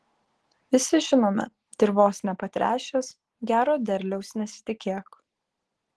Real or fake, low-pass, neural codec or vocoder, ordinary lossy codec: real; 10.8 kHz; none; Opus, 16 kbps